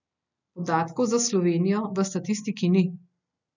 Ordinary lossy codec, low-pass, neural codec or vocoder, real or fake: none; 7.2 kHz; none; real